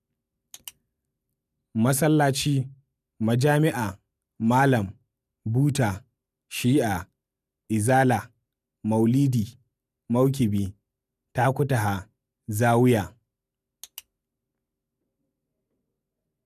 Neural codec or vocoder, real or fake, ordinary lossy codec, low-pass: none; real; none; 14.4 kHz